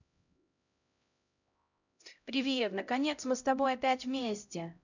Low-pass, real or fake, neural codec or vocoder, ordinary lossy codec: 7.2 kHz; fake; codec, 16 kHz, 0.5 kbps, X-Codec, HuBERT features, trained on LibriSpeech; none